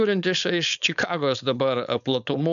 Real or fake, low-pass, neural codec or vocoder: fake; 7.2 kHz; codec, 16 kHz, 4.8 kbps, FACodec